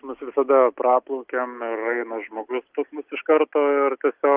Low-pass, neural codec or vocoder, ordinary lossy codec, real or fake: 3.6 kHz; none; Opus, 24 kbps; real